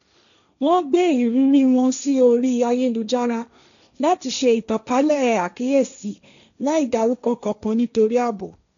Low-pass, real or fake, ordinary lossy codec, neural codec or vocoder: 7.2 kHz; fake; none; codec, 16 kHz, 1.1 kbps, Voila-Tokenizer